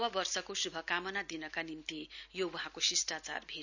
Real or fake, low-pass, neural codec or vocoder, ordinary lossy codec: real; 7.2 kHz; none; none